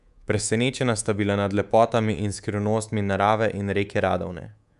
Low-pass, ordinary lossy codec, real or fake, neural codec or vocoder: 10.8 kHz; none; fake; codec, 24 kHz, 3.1 kbps, DualCodec